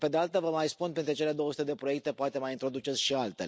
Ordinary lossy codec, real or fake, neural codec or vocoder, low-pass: none; real; none; none